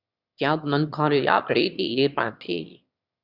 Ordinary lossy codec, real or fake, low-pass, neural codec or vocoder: Opus, 64 kbps; fake; 5.4 kHz; autoencoder, 22.05 kHz, a latent of 192 numbers a frame, VITS, trained on one speaker